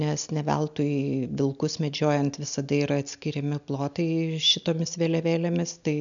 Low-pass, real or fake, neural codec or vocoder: 7.2 kHz; real; none